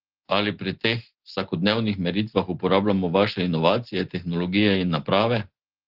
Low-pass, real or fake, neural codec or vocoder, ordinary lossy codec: 5.4 kHz; real; none; Opus, 16 kbps